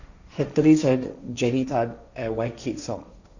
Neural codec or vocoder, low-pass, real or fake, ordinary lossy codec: codec, 16 kHz, 1.1 kbps, Voila-Tokenizer; 7.2 kHz; fake; none